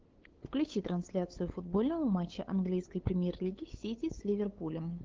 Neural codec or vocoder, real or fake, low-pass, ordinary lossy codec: codec, 16 kHz, 8 kbps, FunCodec, trained on LibriTTS, 25 frames a second; fake; 7.2 kHz; Opus, 16 kbps